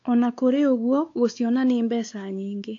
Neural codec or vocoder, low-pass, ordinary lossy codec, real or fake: codec, 16 kHz, 4 kbps, X-Codec, WavLM features, trained on Multilingual LibriSpeech; 7.2 kHz; AAC, 48 kbps; fake